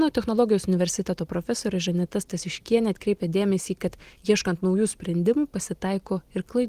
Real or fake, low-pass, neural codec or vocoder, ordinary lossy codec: real; 14.4 kHz; none; Opus, 24 kbps